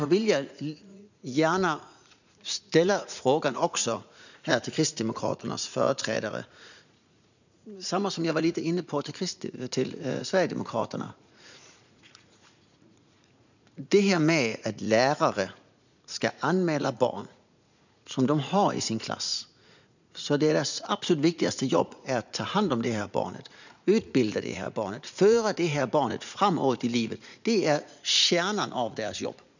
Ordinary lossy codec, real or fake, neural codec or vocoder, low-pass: none; fake; vocoder, 44.1 kHz, 80 mel bands, Vocos; 7.2 kHz